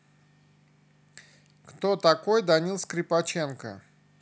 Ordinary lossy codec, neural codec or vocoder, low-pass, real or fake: none; none; none; real